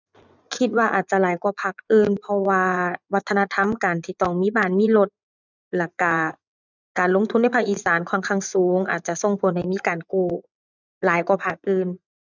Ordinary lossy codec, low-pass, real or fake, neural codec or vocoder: none; 7.2 kHz; fake; vocoder, 24 kHz, 100 mel bands, Vocos